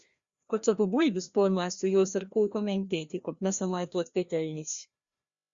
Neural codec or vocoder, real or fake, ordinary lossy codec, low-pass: codec, 16 kHz, 1 kbps, FreqCodec, larger model; fake; Opus, 64 kbps; 7.2 kHz